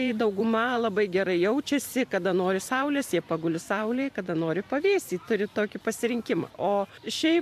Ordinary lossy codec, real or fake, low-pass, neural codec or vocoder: AAC, 96 kbps; fake; 14.4 kHz; vocoder, 44.1 kHz, 128 mel bands every 512 samples, BigVGAN v2